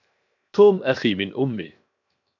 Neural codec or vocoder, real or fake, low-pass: codec, 16 kHz, 0.7 kbps, FocalCodec; fake; 7.2 kHz